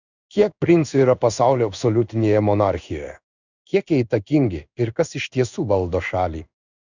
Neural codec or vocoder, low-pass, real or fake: codec, 16 kHz in and 24 kHz out, 1 kbps, XY-Tokenizer; 7.2 kHz; fake